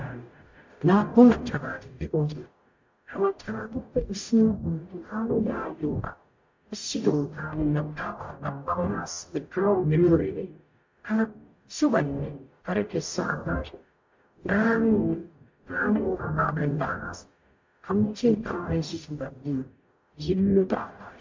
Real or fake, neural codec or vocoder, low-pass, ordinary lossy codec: fake; codec, 44.1 kHz, 0.9 kbps, DAC; 7.2 kHz; MP3, 48 kbps